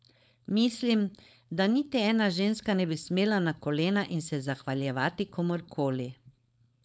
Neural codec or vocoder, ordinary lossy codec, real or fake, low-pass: codec, 16 kHz, 4.8 kbps, FACodec; none; fake; none